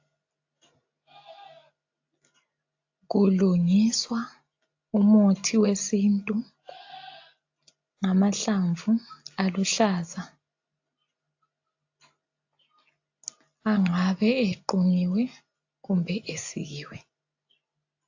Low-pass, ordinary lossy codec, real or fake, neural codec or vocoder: 7.2 kHz; AAC, 48 kbps; real; none